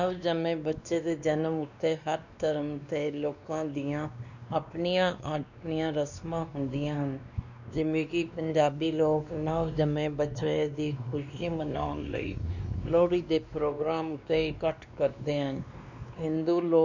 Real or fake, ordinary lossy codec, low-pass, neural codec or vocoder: fake; none; 7.2 kHz; codec, 16 kHz, 2 kbps, X-Codec, WavLM features, trained on Multilingual LibriSpeech